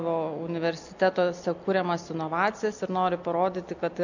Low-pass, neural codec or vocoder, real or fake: 7.2 kHz; none; real